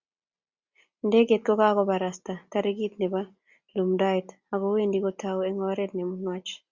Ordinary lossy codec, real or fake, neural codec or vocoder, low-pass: Opus, 64 kbps; real; none; 7.2 kHz